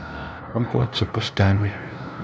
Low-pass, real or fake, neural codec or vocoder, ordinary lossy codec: none; fake; codec, 16 kHz, 0.5 kbps, FunCodec, trained on LibriTTS, 25 frames a second; none